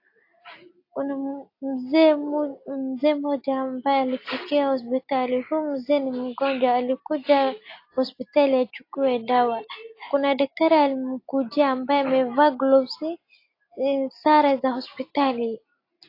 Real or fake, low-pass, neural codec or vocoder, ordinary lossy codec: real; 5.4 kHz; none; AAC, 32 kbps